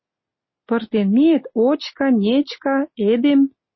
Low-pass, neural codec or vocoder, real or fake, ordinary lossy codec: 7.2 kHz; none; real; MP3, 24 kbps